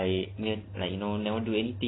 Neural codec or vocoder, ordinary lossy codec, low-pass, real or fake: none; AAC, 16 kbps; 7.2 kHz; real